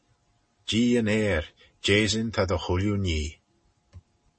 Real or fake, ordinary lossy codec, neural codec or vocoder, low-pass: real; MP3, 32 kbps; none; 9.9 kHz